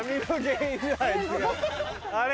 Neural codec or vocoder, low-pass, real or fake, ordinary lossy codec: none; none; real; none